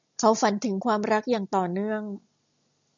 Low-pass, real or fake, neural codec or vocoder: 7.2 kHz; real; none